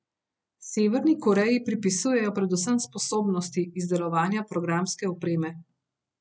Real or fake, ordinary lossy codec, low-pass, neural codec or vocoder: real; none; none; none